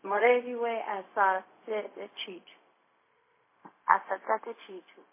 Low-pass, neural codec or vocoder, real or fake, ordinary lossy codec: 3.6 kHz; codec, 16 kHz, 0.4 kbps, LongCat-Audio-Codec; fake; MP3, 16 kbps